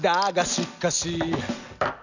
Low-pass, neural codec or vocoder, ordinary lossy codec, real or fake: 7.2 kHz; none; none; real